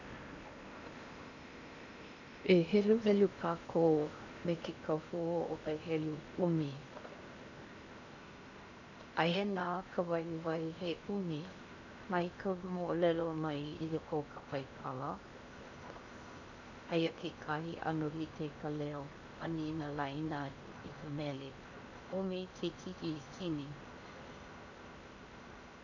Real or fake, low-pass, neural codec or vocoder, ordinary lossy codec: fake; 7.2 kHz; codec, 16 kHz in and 24 kHz out, 0.8 kbps, FocalCodec, streaming, 65536 codes; none